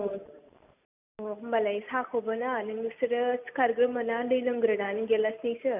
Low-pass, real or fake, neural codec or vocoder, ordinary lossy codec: 3.6 kHz; real; none; none